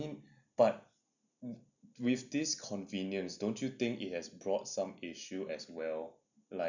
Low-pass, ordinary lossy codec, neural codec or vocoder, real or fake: 7.2 kHz; none; none; real